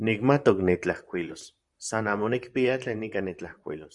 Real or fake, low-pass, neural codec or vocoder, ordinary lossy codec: fake; 10.8 kHz; vocoder, 44.1 kHz, 128 mel bands, Pupu-Vocoder; Opus, 64 kbps